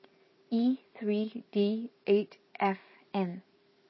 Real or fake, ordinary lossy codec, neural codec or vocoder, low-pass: fake; MP3, 24 kbps; vocoder, 44.1 kHz, 128 mel bands every 512 samples, BigVGAN v2; 7.2 kHz